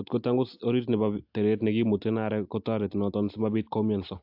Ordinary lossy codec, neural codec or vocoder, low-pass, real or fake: AAC, 48 kbps; none; 5.4 kHz; real